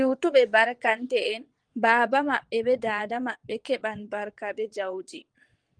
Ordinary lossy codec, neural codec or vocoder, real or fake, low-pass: Opus, 24 kbps; vocoder, 22.05 kHz, 80 mel bands, WaveNeXt; fake; 9.9 kHz